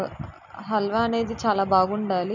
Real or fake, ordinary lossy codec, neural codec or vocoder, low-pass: real; none; none; 7.2 kHz